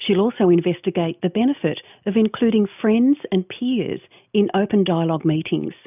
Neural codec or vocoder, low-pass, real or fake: none; 3.6 kHz; real